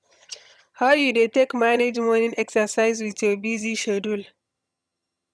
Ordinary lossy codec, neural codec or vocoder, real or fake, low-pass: none; vocoder, 22.05 kHz, 80 mel bands, HiFi-GAN; fake; none